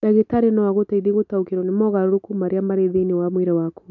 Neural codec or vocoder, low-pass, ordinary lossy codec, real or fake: none; 7.2 kHz; none; real